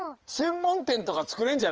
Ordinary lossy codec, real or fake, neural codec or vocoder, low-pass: Opus, 24 kbps; fake; codec, 16 kHz in and 24 kHz out, 2.2 kbps, FireRedTTS-2 codec; 7.2 kHz